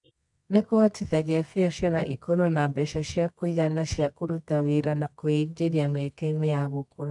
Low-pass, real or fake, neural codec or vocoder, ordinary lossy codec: 10.8 kHz; fake; codec, 24 kHz, 0.9 kbps, WavTokenizer, medium music audio release; none